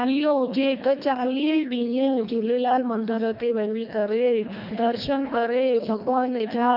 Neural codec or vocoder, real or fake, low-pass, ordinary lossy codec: codec, 24 kHz, 1.5 kbps, HILCodec; fake; 5.4 kHz; none